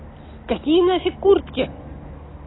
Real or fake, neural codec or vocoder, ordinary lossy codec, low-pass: real; none; AAC, 16 kbps; 7.2 kHz